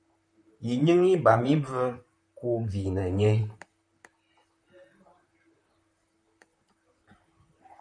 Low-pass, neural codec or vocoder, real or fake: 9.9 kHz; vocoder, 44.1 kHz, 128 mel bands, Pupu-Vocoder; fake